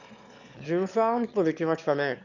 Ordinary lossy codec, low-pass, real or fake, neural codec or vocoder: none; 7.2 kHz; fake; autoencoder, 22.05 kHz, a latent of 192 numbers a frame, VITS, trained on one speaker